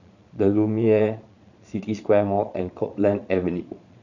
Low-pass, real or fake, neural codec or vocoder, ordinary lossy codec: 7.2 kHz; fake; vocoder, 22.05 kHz, 80 mel bands, Vocos; none